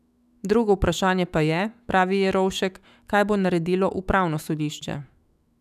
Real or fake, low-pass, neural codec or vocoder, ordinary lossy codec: fake; 14.4 kHz; autoencoder, 48 kHz, 128 numbers a frame, DAC-VAE, trained on Japanese speech; none